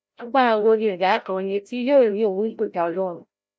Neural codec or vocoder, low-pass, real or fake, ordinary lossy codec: codec, 16 kHz, 0.5 kbps, FreqCodec, larger model; none; fake; none